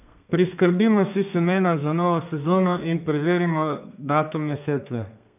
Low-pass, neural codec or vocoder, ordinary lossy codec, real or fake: 3.6 kHz; codec, 32 kHz, 1.9 kbps, SNAC; none; fake